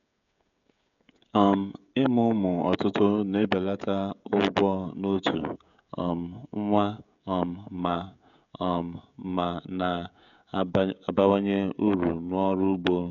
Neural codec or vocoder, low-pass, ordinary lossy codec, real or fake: codec, 16 kHz, 16 kbps, FreqCodec, smaller model; 7.2 kHz; none; fake